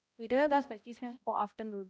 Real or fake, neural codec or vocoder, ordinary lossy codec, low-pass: fake; codec, 16 kHz, 0.5 kbps, X-Codec, HuBERT features, trained on balanced general audio; none; none